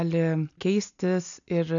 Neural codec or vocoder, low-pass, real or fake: none; 7.2 kHz; real